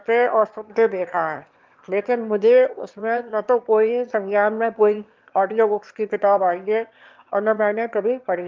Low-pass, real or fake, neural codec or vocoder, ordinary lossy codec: 7.2 kHz; fake; autoencoder, 22.05 kHz, a latent of 192 numbers a frame, VITS, trained on one speaker; Opus, 32 kbps